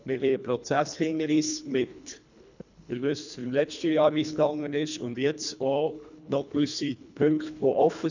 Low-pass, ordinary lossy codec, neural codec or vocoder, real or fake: 7.2 kHz; none; codec, 24 kHz, 1.5 kbps, HILCodec; fake